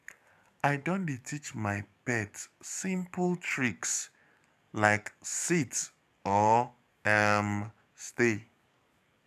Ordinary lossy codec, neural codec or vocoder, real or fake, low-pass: none; codec, 44.1 kHz, 7.8 kbps, DAC; fake; 14.4 kHz